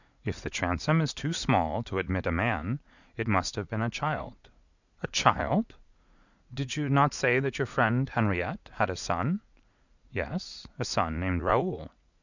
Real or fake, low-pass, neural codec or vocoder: fake; 7.2 kHz; vocoder, 44.1 kHz, 80 mel bands, Vocos